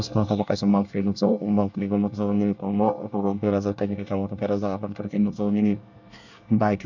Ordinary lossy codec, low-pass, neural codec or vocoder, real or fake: none; 7.2 kHz; codec, 24 kHz, 1 kbps, SNAC; fake